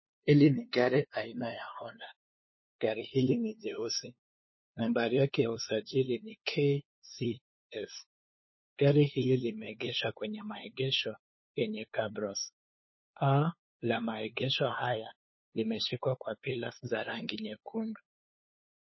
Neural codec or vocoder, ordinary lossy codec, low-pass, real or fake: codec, 16 kHz, 2 kbps, FunCodec, trained on LibriTTS, 25 frames a second; MP3, 24 kbps; 7.2 kHz; fake